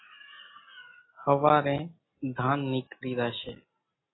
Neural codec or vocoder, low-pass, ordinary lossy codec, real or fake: none; 7.2 kHz; AAC, 16 kbps; real